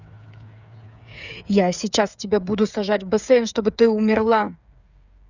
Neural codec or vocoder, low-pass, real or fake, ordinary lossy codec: codec, 16 kHz, 8 kbps, FreqCodec, smaller model; 7.2 kHz; fake; none